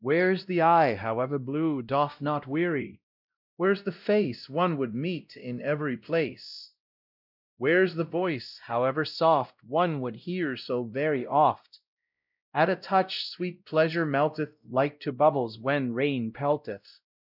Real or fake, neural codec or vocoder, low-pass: fake; codec, 16 kHz, 0.5 kbps, X-Codec, WavLM features, trained on Multilingual LibriSpeech; 5.4 kHz